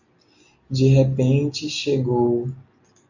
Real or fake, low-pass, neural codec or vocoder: real; 7.2 kHz; none